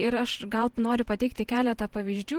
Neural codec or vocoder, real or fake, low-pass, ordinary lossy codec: vocoder, 48 kHz, 128 mel bands, Vocos; fake; 19.8 kHz; Opus, 16 kbps